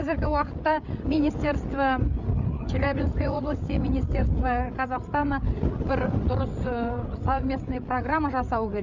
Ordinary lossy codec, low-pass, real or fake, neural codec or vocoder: none; 7.2 kHz; fake; codec, 16 kHz, 8 kbps, FreqCodec, larger model